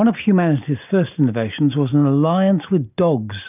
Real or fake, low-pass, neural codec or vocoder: real; 3.6 kHz; none